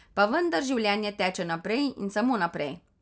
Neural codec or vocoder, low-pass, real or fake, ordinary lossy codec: none; none; real; none